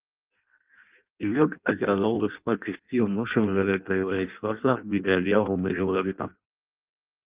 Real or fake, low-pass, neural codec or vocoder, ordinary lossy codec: fake; 3.6 kHz; codec, 16 kHz in and 24 kHz out, 0.6 kbps, FireRedTTS-2 codec; Opus, 32 kbps